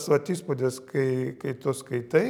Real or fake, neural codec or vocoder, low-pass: real; none; 19.8 kHz